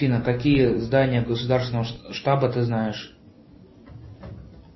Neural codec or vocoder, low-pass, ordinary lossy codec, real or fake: none; 7.2 kHz; MP3, 24 kbps; real